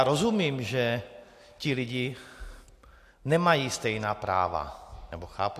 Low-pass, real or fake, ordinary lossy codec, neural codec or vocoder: 14.4 kHz; real; AAC, 64 kbps; none